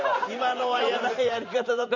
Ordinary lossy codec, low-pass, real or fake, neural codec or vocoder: none; 7.2 kHz; fake; vocoder, 44.1 kHz, 128 mel bands every 512 samples, BigVGAN v2